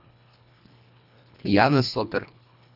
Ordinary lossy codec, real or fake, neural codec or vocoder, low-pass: none; fake; codec, 24 kHz, 1.5 kbps, HILCodec; 5.4 kHz